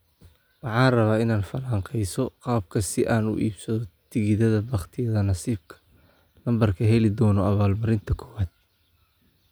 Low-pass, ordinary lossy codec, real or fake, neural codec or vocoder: none; none; real; none